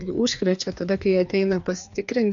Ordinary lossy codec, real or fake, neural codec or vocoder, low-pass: AAC, 64 kbps; fake; codec, 16 kHz, 2 kbps, FreqCodec, larger model; 7.2 kHz